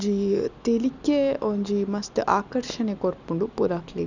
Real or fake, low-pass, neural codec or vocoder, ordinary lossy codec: real; 7.2 kHz; none; none